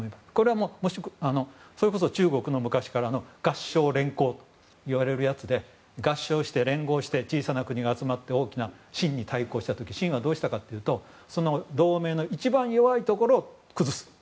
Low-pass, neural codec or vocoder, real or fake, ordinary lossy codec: none; none; real; none